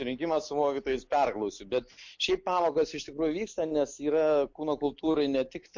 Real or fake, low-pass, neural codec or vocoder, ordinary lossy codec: real; 7.2 kHz; none; MP3, 48 kbps